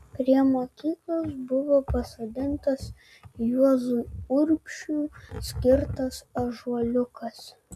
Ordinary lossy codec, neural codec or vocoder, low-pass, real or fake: MP3, 96 kbps; none; 14.4 kHz; real